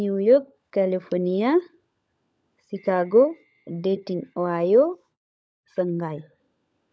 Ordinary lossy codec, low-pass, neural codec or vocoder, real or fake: none; none; codec, 16 kHz, 16 kbps, FunCodec, trained on LibriTTS, 50 frames a second; fake